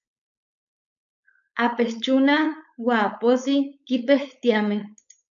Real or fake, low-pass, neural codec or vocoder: fake; 7.2 kHz; codec, 16 kHz, 4.8 kbps, FACodec